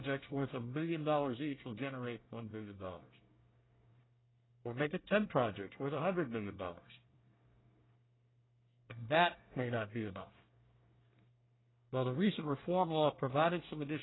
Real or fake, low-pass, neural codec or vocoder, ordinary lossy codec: fake; 7.2 kHz; codec, 24 kHz, 1 kbps, SNAC; AAC, 16 kbps